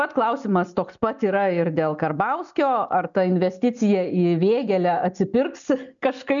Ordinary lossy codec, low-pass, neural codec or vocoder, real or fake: MP3, 96 kbps; 7.2 kHz; none; real